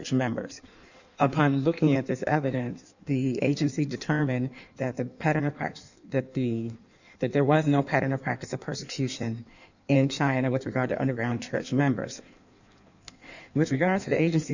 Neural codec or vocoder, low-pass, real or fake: codec, 16 kHz in and 24 kHz out, 1.1 kbps, FireRedTTS-2 codec; 7.2 kHz; fake